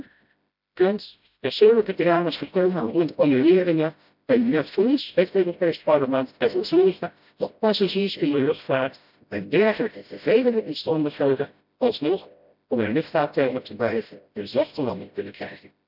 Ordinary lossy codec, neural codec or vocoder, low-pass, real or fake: none; codec, 16 kHz, 0.5 kbps, FreqCodec, smaller model; 5.4 kHz; fake